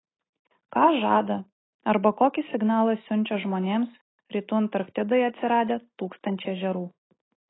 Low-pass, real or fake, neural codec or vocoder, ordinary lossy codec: 7.2 kHz; real; none; AAC, 16 kbps